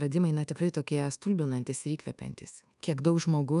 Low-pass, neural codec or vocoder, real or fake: 10.8 kHz; codec, 24 kHz, 1.2 kbps, DualCodec; fake